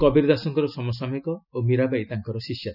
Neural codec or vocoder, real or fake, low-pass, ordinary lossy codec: none; real; 5.4 kHz; none